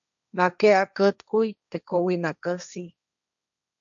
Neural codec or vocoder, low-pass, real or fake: codec, 16 kHz, 1.1 kbps, Voila-Tokenizer; 7.2 kHz; fake